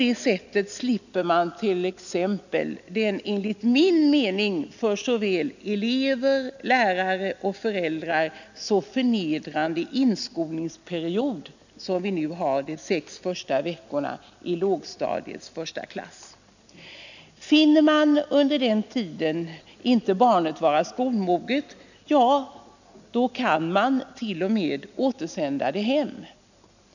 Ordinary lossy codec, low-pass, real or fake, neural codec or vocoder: none; 7.2 kHz; real; none